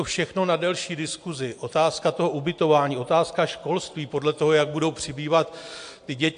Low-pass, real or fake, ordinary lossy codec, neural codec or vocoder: 9.9 kHz; real; AAC, 64 kbps; none